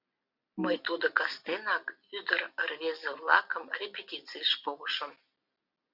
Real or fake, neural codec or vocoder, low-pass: real; none; 5.4 kHz